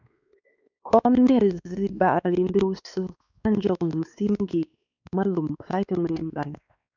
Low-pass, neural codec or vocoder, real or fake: 7.2 kHz; codec, 16 kHz, 2 kbps, X-Codec, WavLM features, trained on Multilingual LibriSpeech; fake